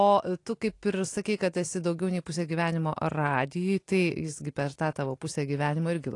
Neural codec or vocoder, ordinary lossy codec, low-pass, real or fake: none; AAC, 48 kbps; 10.8 kHz; real